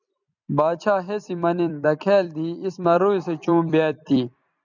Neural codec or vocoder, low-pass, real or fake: vocoder, 44.1 kHz, 128 mel bands every 256 samples, BigVGAN v2; 7.2 kHz; fake